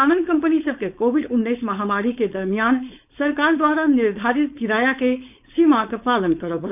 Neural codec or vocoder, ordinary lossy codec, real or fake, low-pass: codec, 16 kHz, 4.8 kbps, FACodec; none; fake; 3.6 kHz